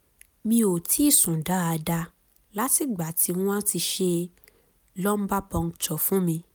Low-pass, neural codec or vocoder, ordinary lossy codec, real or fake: none; none; none; real